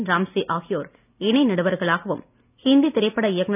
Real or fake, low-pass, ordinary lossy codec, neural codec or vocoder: real; 3.6 kHz; none; none